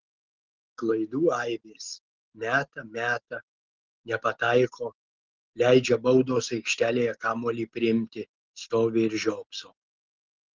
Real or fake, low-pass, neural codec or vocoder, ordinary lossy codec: real; 7.2 kHz; none; Opus, 16 kbps